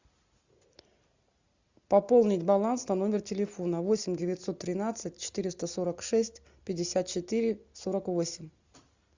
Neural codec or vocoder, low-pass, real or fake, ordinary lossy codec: none; 7.2 kHz; real; Opus, 64 kbps